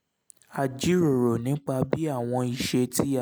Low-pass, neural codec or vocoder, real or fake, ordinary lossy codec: none; none; real; none